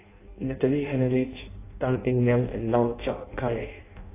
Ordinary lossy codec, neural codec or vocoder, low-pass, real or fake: AAC, 24 kbps; codec, 16 kHz in and 24 kHz out, 0.6 kbps, FireRedTTS-2 codec; 3.6 kHz; fake